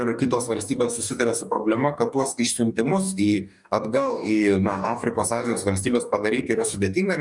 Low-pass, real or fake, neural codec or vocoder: 10.8 kHz; fake; codec, 44.1 kHz, 2.6 kbps, DAC